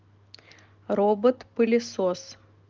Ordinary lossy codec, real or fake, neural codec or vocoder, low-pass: Opus, 32 kbps; real; none; 7.2 kHz